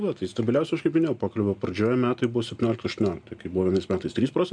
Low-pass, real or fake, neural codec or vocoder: 9.9 kHz; real; none